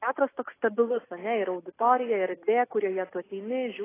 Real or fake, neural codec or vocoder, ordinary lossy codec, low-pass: real; none; AAC, 16 kbps; 3.6 kHz